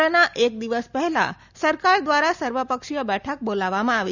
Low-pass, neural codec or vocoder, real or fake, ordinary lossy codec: 7.2 kHz; none; real; none